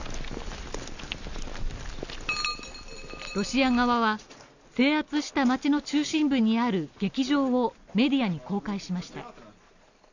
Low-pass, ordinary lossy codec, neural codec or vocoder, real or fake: 7.2 kHz; none; none; real